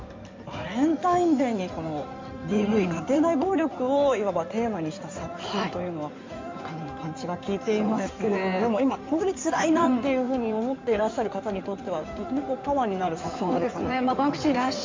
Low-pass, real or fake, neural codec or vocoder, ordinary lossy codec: 7.2 kHz; fake; codec, 16 kHz in and 24 kHz out, 2.2 kbps, FireRedTTS-2 codec; MP3, 64 kbps